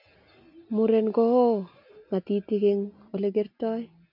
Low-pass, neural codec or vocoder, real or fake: 5.4 kHz; none; real